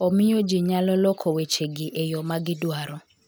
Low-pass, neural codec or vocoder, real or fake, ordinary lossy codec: none; none; real; none